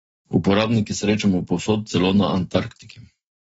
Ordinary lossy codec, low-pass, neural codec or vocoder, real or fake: AAC, 24 kbps; 19.8 kHz; autoencoder, 48 kHz, 128 numbers a frame, DAC-VAE, trained on Japanese speech; fake